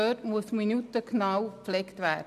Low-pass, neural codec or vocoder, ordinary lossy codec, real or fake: 14.4 kHz; none; none; real